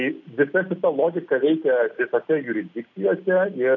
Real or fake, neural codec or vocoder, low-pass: real; none; 7.2 kHz